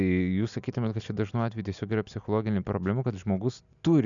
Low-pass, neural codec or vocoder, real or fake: 7.2 kHz; none; real